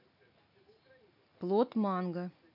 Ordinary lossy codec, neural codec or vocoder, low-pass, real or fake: none; none; 5.4 kHz; real